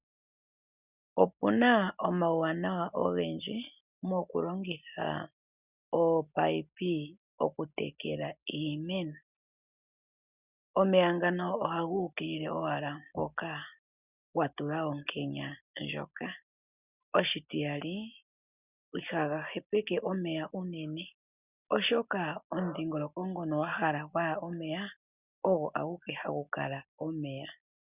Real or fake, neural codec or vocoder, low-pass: real; none; 3.6 kHz